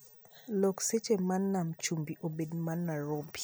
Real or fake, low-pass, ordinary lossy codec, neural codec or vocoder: real; none; none; none